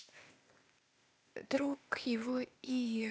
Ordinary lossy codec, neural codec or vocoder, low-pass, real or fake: none; codec, 16 kHz, 0.8 kbps, ZipCodec; none; fake